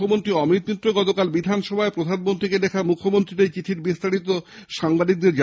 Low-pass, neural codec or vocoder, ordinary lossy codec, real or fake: none; none; none; real